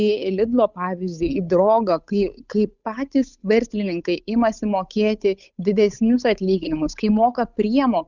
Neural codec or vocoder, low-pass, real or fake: codec, 16 kHz, 8 kbps, FunCodec, trained on Chinese and English, 25 frames a second; 7.2 kHz; fake